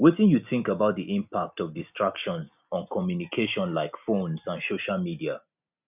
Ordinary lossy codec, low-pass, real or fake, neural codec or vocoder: none; 3.6 kHz; real; none